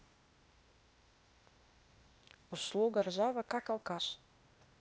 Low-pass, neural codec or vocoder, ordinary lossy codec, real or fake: none; codec, 16 kHz, 0.8 kbps, ZipCodec; none; fake